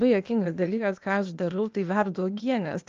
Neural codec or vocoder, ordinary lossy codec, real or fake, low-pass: codec, 16 kHz, 0.8 kbps, ZipCodec; Opus, 24 kbps; fake; 7.2 kHz